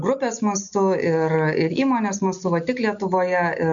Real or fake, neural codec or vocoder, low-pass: real; none; 7.2 kHz